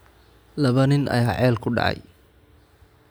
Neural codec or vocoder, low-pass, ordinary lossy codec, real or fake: none; none; none; real